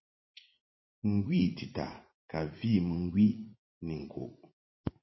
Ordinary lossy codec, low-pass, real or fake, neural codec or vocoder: MP3, 24 kbps; 7.2 kHz; fake; vocoder, 44.1 kHz, 80 mel bands, Vocos